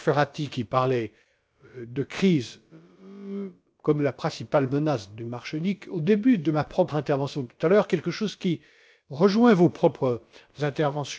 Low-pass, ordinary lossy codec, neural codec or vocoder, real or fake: none; none; codec, 16 kHz, about 1 kbps, DyCAST, with the encoder's durations; fake